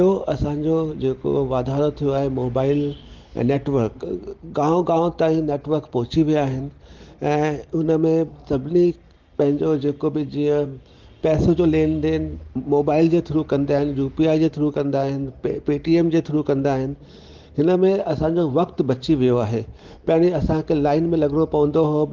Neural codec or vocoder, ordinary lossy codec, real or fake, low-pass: none; Opus, 16 kbps; real; 7.2 kHz